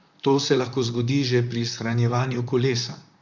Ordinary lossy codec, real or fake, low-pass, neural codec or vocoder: Opus, 64 kbps; fake; 7.2 kHz; vocoder, 44.1 kHz, 128 mel bands, Pupu-Vocoder